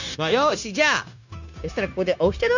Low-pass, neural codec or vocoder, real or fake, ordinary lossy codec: 7.2 kHz; codec, 16 kHz, 0.9 kbps, LongCat-Audio-Codec; fake; none